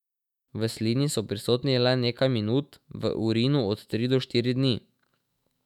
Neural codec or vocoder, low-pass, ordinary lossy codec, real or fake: autoencoder, 48 kHz, 128 numbers a frame, DAC-VAE, trained on Japanese speech; 19.8 kHz; none; fake